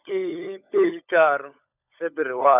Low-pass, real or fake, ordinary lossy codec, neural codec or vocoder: 3.6 kHz; fake; none; codec, 16 kHz, 16 kbps, FunCodec, trained on LibriTTS, 50 frames a second